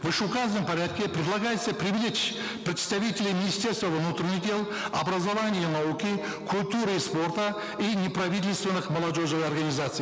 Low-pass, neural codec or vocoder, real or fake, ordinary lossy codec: none; none; real; none